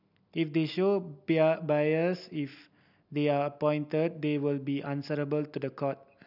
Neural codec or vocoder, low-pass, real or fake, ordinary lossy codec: none; 5.4 kHz; real; none